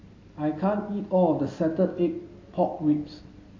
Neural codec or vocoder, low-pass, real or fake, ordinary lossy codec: none; 7.2 kHz; real; AAC, 32 kbps